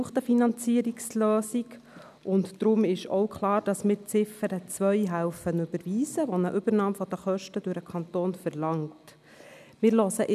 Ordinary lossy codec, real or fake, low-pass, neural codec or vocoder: none; real; 14.4 kHz; none